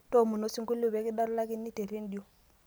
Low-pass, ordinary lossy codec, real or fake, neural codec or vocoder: none; none; real; none